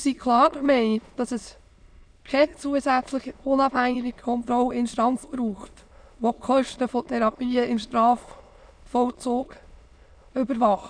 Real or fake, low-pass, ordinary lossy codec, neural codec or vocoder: fake; 9.9 kHz; none; autoencoder, 22.05 kHz, a latent of 192 numbers a frame, VITS, trained on many speakers